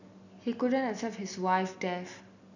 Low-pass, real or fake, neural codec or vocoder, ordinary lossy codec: 7.2 kHz; real; none; none